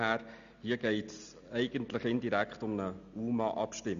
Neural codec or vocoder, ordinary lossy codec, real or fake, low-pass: none; none; real; 7.2 kHz